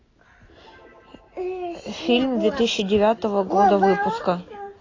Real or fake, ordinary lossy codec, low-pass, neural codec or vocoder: real; AAC, 32 kbps; 7.2 kHz; none